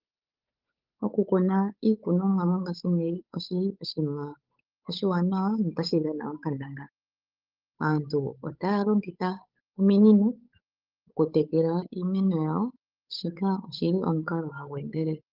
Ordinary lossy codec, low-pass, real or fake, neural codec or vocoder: Opus, 32 kbps; 5.4 kHz; fake; codec, 16 kHz, 8 kbps, FunCodec, trained on Chinese and English, 25 frames a second